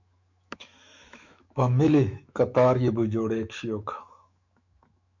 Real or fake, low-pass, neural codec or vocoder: fake; 7.2 kHz; autoencoder, 48 kHz, 128 numbers a frame, DAC-VAE, trained on Japanese speech